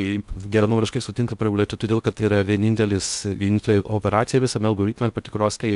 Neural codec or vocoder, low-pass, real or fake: codec, 16 kHz in and 24 kHz out, 0.6 kbps, FocalCodec, streaming, 2048 codes; 10.8 kHz; fake